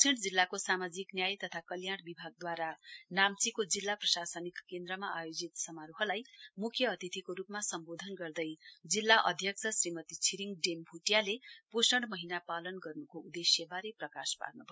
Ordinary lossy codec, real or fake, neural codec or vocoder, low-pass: none; real; none; none